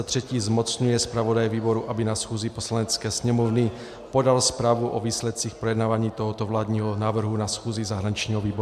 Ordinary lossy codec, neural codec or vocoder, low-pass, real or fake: MP3, 96 kbps; none; 14.4 kHz; real